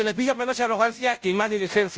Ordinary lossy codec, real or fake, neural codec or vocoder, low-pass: none; fake; codec, 16 kHz, 0.5 kbps, FunCodec, trained on Chinese and English, 25 frames a second; none